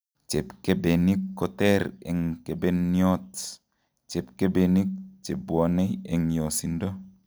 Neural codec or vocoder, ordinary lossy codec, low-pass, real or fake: none; none; none; real